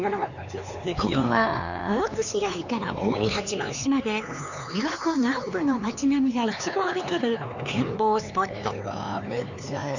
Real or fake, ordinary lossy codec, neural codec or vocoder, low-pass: fake; none; codec, 16 kHz, 4 kbps, X-Codec, HuBERT features, trained on LibriSpeech; 7.2 kHz